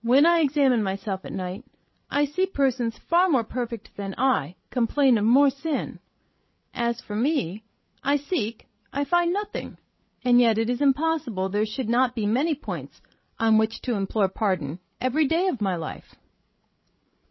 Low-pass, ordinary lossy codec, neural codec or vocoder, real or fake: 7.2 kHz; MP3, 24 kbps; codec, 16 kHz, 16 kbps, FreqCodec, larger model; fake